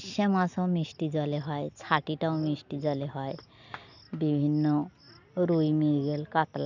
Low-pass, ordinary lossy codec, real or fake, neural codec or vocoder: 7.2 kHz; none; real; none